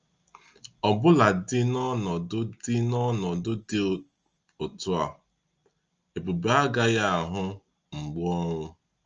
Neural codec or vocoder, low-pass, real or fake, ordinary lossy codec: none; 7.2 kHz; real; Opus, 24 kbps